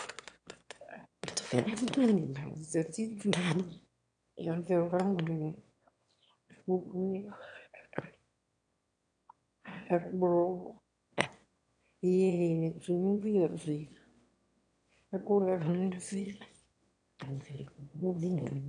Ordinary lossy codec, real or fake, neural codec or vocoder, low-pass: AAC, 64 kbps; fake; autoencoder, 22.05 kHz, a latent of 192 numbers a frame, VITS, trained on one speaker; 9.9 kHz